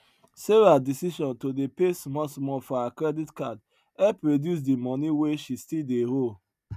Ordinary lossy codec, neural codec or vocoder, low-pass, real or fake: none; none; 14.4 kHz; real